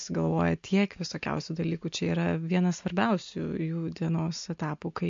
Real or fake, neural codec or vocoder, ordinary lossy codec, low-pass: real; none; MP3, 48 kbps; 7.2 kHz